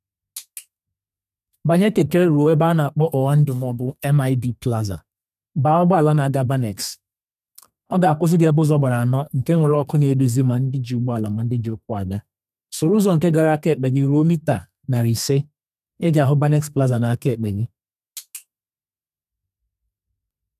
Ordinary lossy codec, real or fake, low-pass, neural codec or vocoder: none; fake; 14.4 kHz; codec, 32 kHz, 1.9 kbps, SNAC